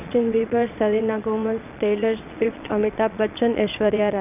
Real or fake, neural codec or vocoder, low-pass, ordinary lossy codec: fake; vocoder, 22.05 kHz, 80 mel bands, Vocos; 3.6 kHz; none